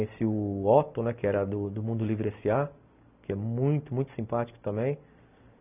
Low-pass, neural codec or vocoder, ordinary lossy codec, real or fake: 3.6 kHz; none; none; real